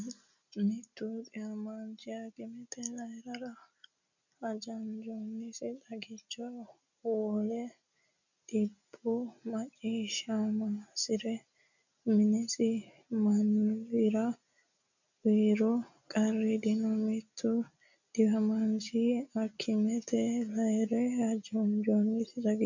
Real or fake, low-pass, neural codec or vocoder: real; 7.2 kHz; none